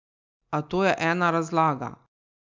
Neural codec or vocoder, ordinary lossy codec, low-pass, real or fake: none; MP3, 64 kbps; 7.2 kHz; real